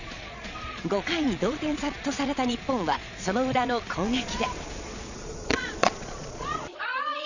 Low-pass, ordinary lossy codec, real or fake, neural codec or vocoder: 7.2 kHz; none; fake; vocoder, 22.05 kHz, 80 mel bands, Vocos